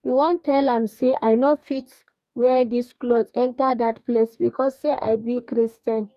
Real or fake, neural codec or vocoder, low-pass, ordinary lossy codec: fake; codec, 44.1 kHz, 2.6 kbps, DAC; 14.4 kHz; none